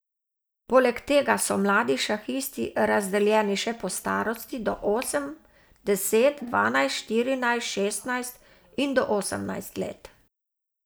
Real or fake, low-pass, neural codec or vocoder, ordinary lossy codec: real; none; none; none